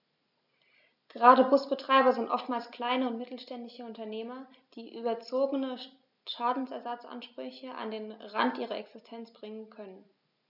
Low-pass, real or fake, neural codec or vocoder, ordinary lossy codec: 5.4 kHz; real; none; none